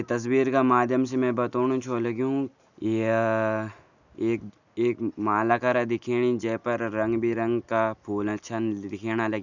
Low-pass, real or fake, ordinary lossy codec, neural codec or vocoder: 7.2 kHz; real; none; none